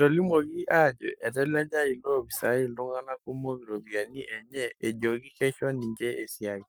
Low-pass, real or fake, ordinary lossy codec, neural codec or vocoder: none; fake; none; codec, 44.1 kHz, 7.8 kbps, Pupu-Codec